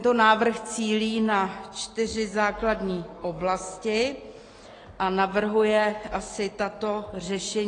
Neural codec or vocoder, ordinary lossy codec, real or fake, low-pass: none; AAC, 32 kbps; real; 9.9 kHz